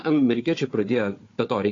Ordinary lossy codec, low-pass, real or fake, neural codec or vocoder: AAC, 32 kbps; 7.2 kHz; fake; codec, 16 kHz, 4 kbps, FunCodec, trained on Chinese and English, 50 frames a second